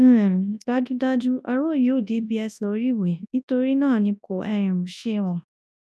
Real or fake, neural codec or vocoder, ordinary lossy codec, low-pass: fake; codec, 24 kHz, 0.9 kbps, WavTokenizer, large speech release; none; none